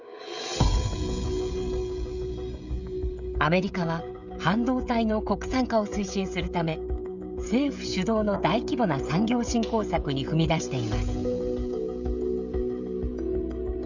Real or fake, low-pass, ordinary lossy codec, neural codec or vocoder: fake; 7.2 kHz; none; codec, 16 kHz, 16 kbps, FreqCodec, smaller model